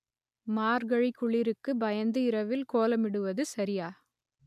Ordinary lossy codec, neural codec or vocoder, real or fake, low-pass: MP3, 96 kbps; none; real; 14.4 kHz